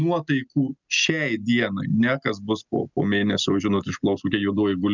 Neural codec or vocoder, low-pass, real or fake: none; 7.2 kHz; real